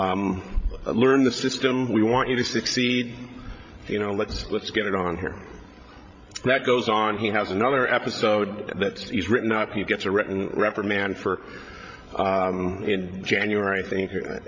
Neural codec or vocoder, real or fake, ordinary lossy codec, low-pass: codec, 16 kHz, 16 kbps, FreqCodec, larger model; fake; MP3, 48 kbps; 7.2 kHz